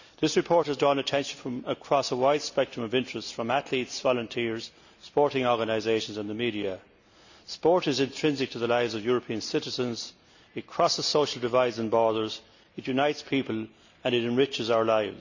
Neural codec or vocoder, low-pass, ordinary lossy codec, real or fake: none; 7.2 kHz; none; real